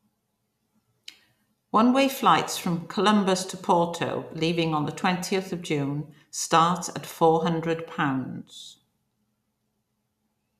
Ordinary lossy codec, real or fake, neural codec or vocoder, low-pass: none; real; none; 14.4 kHz